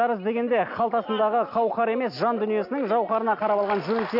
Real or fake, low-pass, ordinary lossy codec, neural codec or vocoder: real; 5.4 kHz; AAC, 48 kbps; none